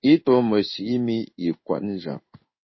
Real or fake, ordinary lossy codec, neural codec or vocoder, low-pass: fake; MP3, 24 kbps; codec, 16 kHz in and 24 kHz out, 1 kbps, XY-Tokenizer; 7.2 kHz